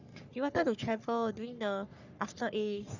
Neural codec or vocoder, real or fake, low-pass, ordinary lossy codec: codec, 44.1 kHz, 3.4 kbps, Pupu-Codec; fake; 7.2 kHz; none